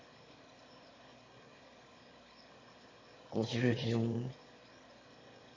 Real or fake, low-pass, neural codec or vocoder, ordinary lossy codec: fake; 7.2 kHz; autoencoder, 22.05 kHz, a latent of 192 numbers a frame, VITS, trained on one speaker; MP3, 32 kbps